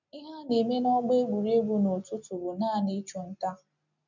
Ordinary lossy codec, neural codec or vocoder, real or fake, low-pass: none; none; real; 7.2 kHz